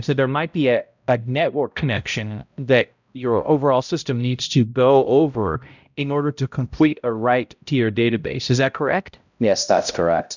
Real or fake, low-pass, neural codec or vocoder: fake; 7.2 kHz; codec, 16 kHz, 0.5 kbps, X-Codec, HuBERT features, trained on balanced general audio